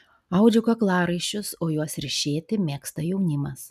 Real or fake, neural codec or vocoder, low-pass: real; none; 14.4 kHz